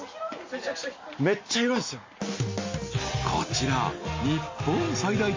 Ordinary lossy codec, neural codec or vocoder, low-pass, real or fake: MP3, 32 kbps; none; 7.2 kHz; real